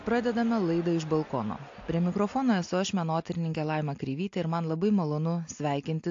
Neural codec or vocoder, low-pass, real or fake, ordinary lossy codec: none; 7.2 kHz; real; AAC, 48 kbps